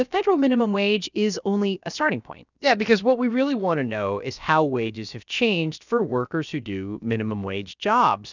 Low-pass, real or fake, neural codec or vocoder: 7.2 kHz; fake; codec, 16 kHz, about 1 kbps, DyCAST, with the encoder's durations